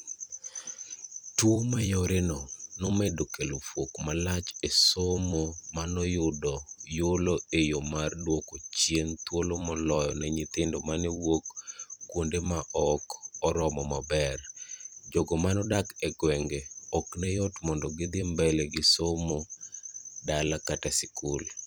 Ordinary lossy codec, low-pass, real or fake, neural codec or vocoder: none; none; fake; vocoder, 44.1 kHz, 128 mel bands every 256 samples, BigVGAN v2